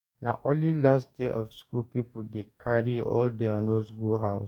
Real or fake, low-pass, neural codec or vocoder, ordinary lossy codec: fake; 19.8 kHz; codec, 44.1 kHz, 2.6 kbps, DAC; none